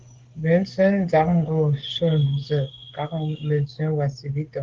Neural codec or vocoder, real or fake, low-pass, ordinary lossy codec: codec, 16 kHz, 16 kbps, FreqCodec, smaller model; fake; 7.2 kHz; Opus, 16 kbps